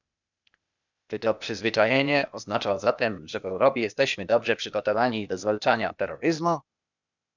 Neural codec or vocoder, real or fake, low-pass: codec, 16 kHz, 0.8 kbps, ZipCodec; fake; 7.2 kHz